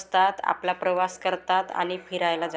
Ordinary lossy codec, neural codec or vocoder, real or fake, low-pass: none; none; real; none